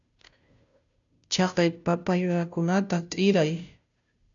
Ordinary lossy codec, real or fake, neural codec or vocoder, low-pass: MP3, 96 kbps; fake; codec, 16 kHz, 0.5 kbps, FunCodec, trained on Chinese and English, 25 frames a second; 7.2 kHz